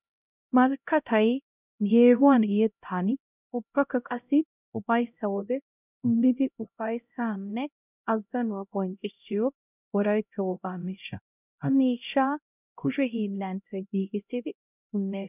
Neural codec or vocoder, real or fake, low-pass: codec, 16 kHz, 0.5 kbps, X-Codec, HuBERT features, trained on LibriSpeech; fake; 3.6 kHz